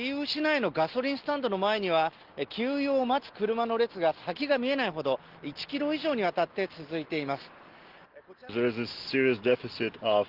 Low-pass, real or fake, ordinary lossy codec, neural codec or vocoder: 5.4 kHz; real; Opus, 16 kbps; none